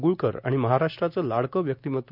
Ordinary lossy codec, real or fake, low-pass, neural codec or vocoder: none; real; 5.4 kHz; none